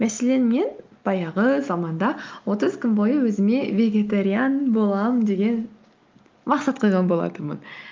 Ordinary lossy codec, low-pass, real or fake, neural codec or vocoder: Opus, 32 kbps; 7.2 kHz; real; none